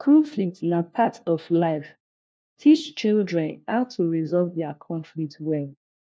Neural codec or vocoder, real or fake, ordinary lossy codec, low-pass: codec, 16 kHz, 1 kbps, FunCodec, trained on LibriTTS, 50 frames a second; fake; none; none